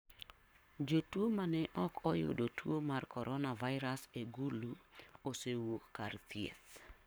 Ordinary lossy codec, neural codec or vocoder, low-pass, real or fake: none; codec, 44.1 kHz, 7.8 kbps, Pupu-Codec; none; fake